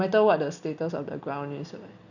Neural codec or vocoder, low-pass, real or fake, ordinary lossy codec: none; 7.2 kHz; real; none